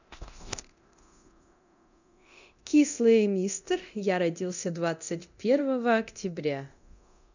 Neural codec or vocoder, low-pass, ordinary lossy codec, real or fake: codec, 24 kHz, 0.9 kbps, DualCodec; 7.2 kHz; none; fake